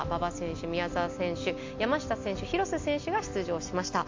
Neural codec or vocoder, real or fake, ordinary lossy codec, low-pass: none; real; MP3, 48 kbps; 7.2 kHz